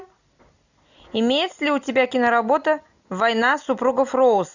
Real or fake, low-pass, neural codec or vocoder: real; 7.2 kHz; none